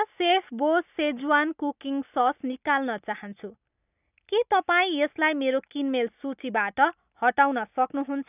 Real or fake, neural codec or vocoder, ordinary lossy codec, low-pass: real; none; none; 3.6 kHz